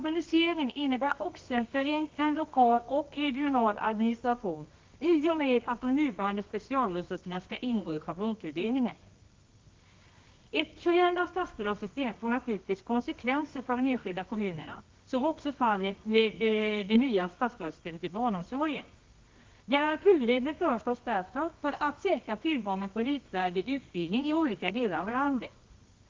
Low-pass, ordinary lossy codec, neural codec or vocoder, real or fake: 7.2 kHz; Opus, 24 kbps; codec, 24 kHz, 0.9 kbps, WavTokenizer, medium music audio release; fake